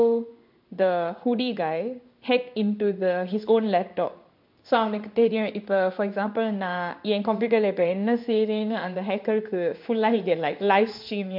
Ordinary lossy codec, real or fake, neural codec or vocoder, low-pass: MP3, 48 kbps; fake; codec, 16 kHz in and 24 kHz out, 1 kbps, XY-Tokenizer; 5.4 kHz